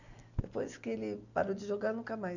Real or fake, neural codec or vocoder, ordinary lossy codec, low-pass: real; none; none; 7.2 kHz